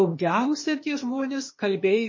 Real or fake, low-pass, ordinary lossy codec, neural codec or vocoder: fake; 7.2 kHz; MP3, 32 kbps; codec, 16 kHz, 0.8 kbps, ZipCodec